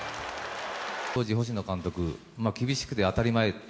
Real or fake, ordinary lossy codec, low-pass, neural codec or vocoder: real; none; none; none